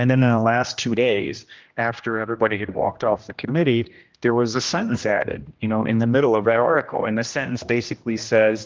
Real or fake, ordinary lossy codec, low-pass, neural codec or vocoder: fake; Opus, 32 kbps; 7.2 kHz; codec, 16 kHz, 1 kbps, X-Codec, HuBERT features, trained on general audio